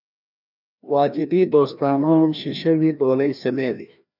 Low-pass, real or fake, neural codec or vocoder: 5.4 kHz; fake; codec, 16 kHz, 1 kbps, FreqCodec, larger model